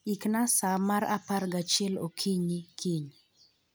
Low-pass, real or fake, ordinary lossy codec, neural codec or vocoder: none; real; none; none